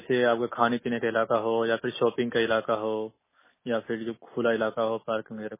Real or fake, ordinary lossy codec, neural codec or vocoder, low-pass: real; MP3, 16 kbps; none; 3.6 kHz